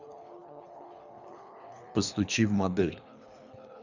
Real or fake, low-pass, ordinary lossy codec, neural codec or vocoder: fake; 7.2 kHz; none; codec, 24 kHz, 3 kbps, HILCodec